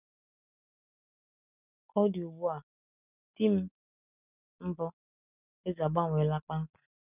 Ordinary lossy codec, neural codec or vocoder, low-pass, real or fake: none; none; 3.6 kHz; real